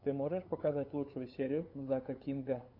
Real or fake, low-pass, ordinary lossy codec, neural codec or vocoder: fake; 5.4 kHz; AAC, 32 kbps; codec, 16 kHz, 8 kbps, FunCodec, trained on LibriTTS, 25 frames a second